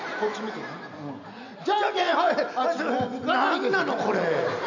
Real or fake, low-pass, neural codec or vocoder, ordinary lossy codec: real; 7.2 kHz; none; none